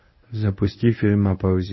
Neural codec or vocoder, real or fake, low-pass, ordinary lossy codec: codec, 24 kHz, 0.9 kbps, WavTokenizer, medium speech release version 1; fake; 7.2 kHz; MP3, 24 kbps